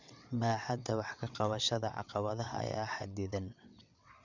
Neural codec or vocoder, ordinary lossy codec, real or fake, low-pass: vocoder, 22.05 kHz, 80 mel bands, WaveNeXt; none; fake; 7.2 kHz